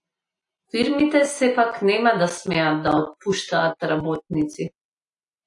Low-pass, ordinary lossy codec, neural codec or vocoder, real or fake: 10.8 kHz; AAC, 64 kbps; none; real